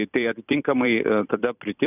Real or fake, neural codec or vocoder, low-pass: fake; vocoder, 44.1 kHz, 128 mel bands every 256 samples, BigVGAN v2; 3.6 kHz